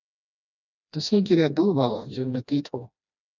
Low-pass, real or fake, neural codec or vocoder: 7.2 kHz; fake; codec, 16 kHz, 1 kbps, FreqCodec, smaller model